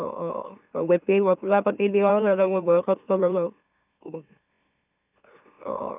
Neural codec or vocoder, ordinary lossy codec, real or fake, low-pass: autoencoder, 44.1 kHz, a latent of 192 numbers a frame, MeloTTS; none; fake; 3.6 kHz